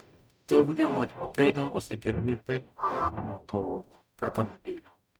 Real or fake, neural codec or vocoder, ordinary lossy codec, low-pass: fake; codec, 44.1 kHz, 0.9 kbps, DAC; none; none